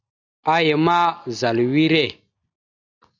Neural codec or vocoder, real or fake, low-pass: none; real; 7.2 kHz